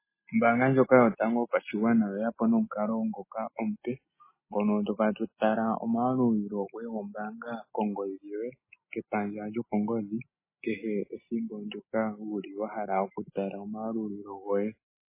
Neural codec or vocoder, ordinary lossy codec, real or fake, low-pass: none; MP3, 16 kbps; real; 3.6 kHz